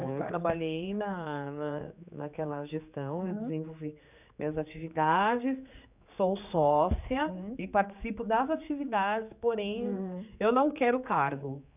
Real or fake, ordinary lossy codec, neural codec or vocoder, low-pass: fake; none; codec, 16 kHz, 4 kbps, X-Codec, HuBERT features, trained on general audio; 3.6 kHz